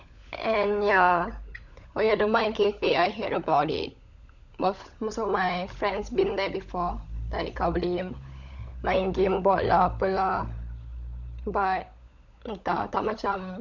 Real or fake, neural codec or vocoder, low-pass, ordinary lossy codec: fake; codec, 16 kHz, 16 kbps, FunCodec, trained on LibriTTS, 50 frames a second; 7.2 kHz; none